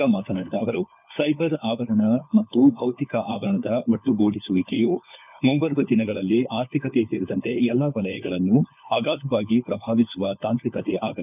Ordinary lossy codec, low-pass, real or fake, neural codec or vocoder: none; 3.6 kHz; fake; codec, 16 kHz, 4 kbps, FunCodec, trained on LibriTTS, 50 frames a second